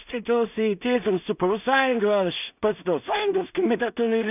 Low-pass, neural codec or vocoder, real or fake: 3.6 kHz; codec, 16 kHz in and 24 kHz out, 0.4 kbps, LongCat-Audio-Codec, two codebook decoder; fake